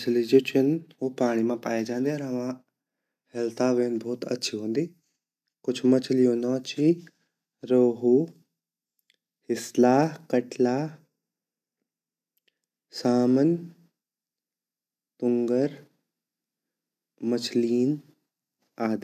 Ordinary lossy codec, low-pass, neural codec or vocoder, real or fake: none; 14.4 kHz; none; real